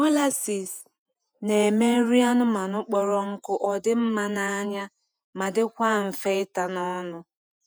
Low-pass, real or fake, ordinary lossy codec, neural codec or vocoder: none; fake; none; vocoder, 48 kHz, 128 mel bands, Vocos